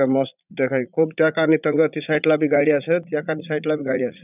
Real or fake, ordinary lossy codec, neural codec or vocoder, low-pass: fake; none; vocoder, 44.1 kHz, 80 mel bands, Vocos; 3.6 kHz